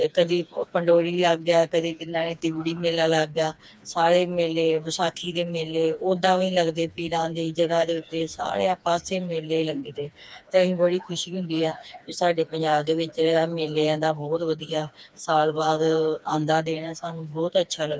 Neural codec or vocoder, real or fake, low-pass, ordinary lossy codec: codec, 16 kHz, 2 kbps, FreqCodec, smaller model; fake; none; none